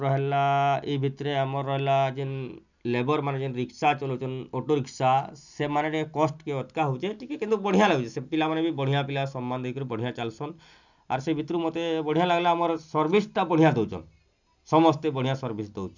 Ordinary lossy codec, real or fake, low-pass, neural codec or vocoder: none; real; 7.2 kHz; none